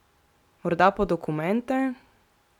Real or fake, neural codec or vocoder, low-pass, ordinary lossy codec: real; none; 19.8 kHz; none